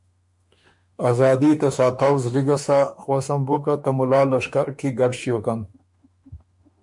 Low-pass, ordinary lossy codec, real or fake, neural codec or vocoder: 10.8 kHz; MP3, 64 kbps; fake; autoencoder, 48 kHz, 32 numbers a frame, DAC-VAE, trained on Japanese speech